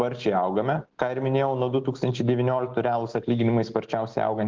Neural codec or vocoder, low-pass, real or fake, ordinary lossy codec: none; 7.2 kHz; real; Opus, 16 kbps